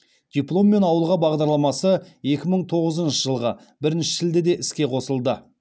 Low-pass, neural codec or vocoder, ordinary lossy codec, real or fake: none; none; none; real